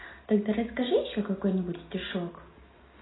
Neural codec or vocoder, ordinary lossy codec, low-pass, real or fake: none; AAC, 16 kbps; 7.2 kHz; real